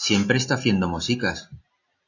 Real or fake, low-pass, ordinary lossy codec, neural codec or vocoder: real; 7.2 kHz; AAC, 48 kbps; none